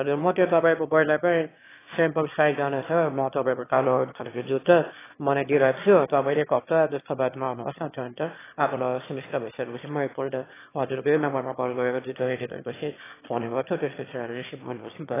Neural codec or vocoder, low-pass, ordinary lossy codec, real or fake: autoencoder, 22.05 kHz, a latent of 192 numbers a frame, VITS, trained on one speaker; 3.6 kHz; AAC, 16 kbps; fake